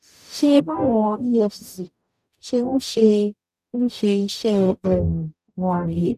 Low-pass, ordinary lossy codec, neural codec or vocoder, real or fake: 14.4 kHz; none; codec, 44.1 kHz, 0.9 kbps, DAC; fake